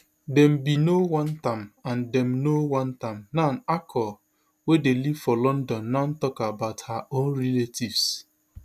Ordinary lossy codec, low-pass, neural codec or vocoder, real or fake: none; 14.4 kHz; none; real